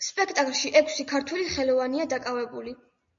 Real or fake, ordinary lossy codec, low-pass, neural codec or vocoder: real; MP3, 48 kbps; 7.2 kHz; none